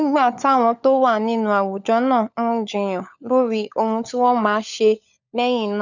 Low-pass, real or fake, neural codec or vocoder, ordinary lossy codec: 7.2 kHz; fake; codec, 16 kHz, 16 kbps, FunCodec, trained on LibriTTS, 50 frames a second; none